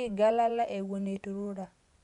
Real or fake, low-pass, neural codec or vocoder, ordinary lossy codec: fake; 10.8 kHz; vocoder, 24 kHz, 100 mel bands, Vocos; none